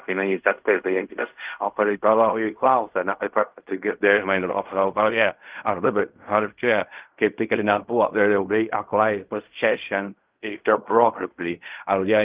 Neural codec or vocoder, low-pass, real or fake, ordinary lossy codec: codec, 16 kHz in and 24 kHz out, 0.4 kbps, LongCat-Audio-Codec, fine tuned four codebook decoder; 3.6 kHz; fake; Opus, 24 kbps